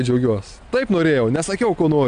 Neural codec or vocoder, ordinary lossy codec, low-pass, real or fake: none; AAC, 96 kbps; 9.9 kHz; real